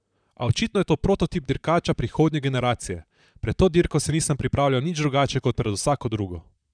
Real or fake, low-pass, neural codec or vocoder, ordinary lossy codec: fake; 9.9 kHz; vocoder, 44.1 kHz, 128 mel bands, Pupu-Vocoder; none